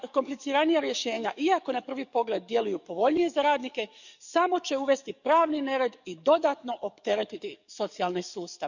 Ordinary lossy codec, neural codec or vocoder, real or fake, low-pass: none; codec, 44.1 kHz, 7.8 kbps, DAC; fake; 7.2 kHz